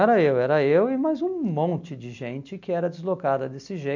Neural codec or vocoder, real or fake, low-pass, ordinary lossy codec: none; real; 7.2 kHz; MP3, 48 kbps